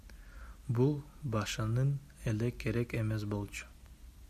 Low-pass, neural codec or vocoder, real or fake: 14.4 kHz; none; real